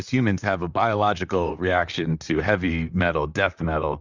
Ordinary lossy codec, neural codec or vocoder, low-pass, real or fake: Opus, 64 kbps; vocoder, 44.1 kHz, 128 mel bands, Pupu-Vocoder; 7.2 kHz; fake